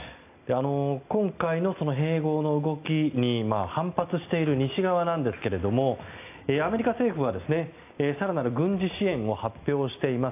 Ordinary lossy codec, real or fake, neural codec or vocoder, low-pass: AAC, 32 kbps; real; none; 3.6 kHz